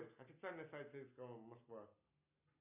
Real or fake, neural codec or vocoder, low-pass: real; none; 3.6 kHz